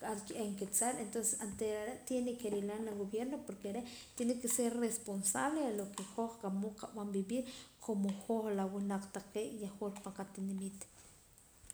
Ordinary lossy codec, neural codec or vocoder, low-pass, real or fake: none; none; none; real